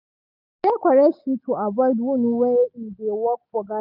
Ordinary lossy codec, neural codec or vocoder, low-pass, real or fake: none; none; 5.4 kHz; real